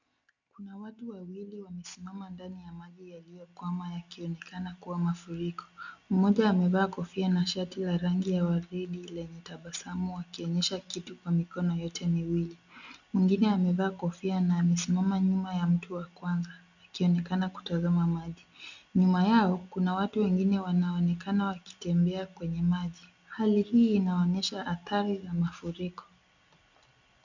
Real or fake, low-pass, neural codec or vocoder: real; 7.2 kHz; none